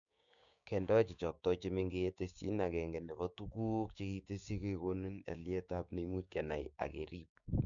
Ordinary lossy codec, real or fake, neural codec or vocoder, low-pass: none; fake; codec, 16 kHz, 6 kbps, DAC; 7.2 kHz